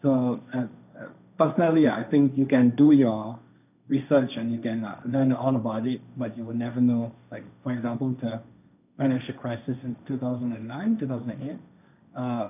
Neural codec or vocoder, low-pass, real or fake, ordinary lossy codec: codec, 16 kHz, 1.1 kbps, Voila-Tokenizer; 3.6 kHz; fake; none